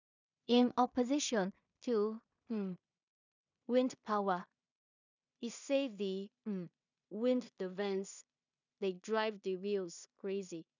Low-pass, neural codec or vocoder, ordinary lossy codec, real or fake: 7.2 kHz; codec, 16 kHz in and 24 kHz out, 0.4 kbps, LongCat-Audio-Codec, two codebook decoder; none; fake